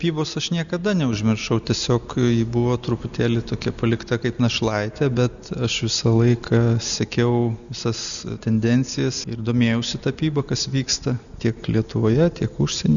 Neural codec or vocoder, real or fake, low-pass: none; real; 7.2 kHz